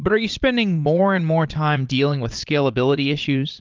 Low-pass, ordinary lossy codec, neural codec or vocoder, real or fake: 7.2 kHz; Opus, 32 kbps; vocoder, 22.05 kHz, 80 mel bands, Vocos; fake